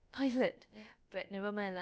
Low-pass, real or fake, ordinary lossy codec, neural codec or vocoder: none; fake; none; codec, 16 kHz, about 1 kbps, DyCAST, with the encoder's durations